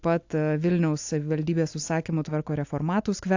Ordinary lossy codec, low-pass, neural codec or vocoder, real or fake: AAC, 48 kbps; 7.2 kHz; none; real